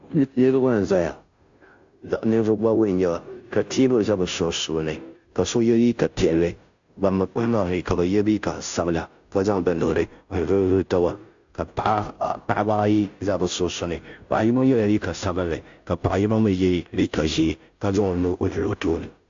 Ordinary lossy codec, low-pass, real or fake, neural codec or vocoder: AAC, 64 kbps; 7.2 kHz; fake; codec, 16 kHz, 0.5 kbps, FunCodec, trained on Chinese and English, 25 frames a second